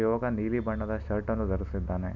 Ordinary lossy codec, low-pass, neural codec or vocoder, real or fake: none; 7.2 kHz; none; real